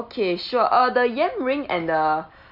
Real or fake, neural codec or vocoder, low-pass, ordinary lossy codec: real; none; 5.4 kHz; none